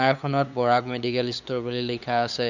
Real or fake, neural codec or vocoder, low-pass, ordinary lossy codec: fake; codec, 16 kHz, 8 kbps, FunCodec, trained on LibriTTS, 25 frames a second; 7.2 kHz; none